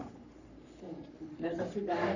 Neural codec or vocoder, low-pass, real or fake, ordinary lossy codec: codec, 44.1 kHz, 3.4 kbps, Pupu-Codec; 7.2 kHz; fake; none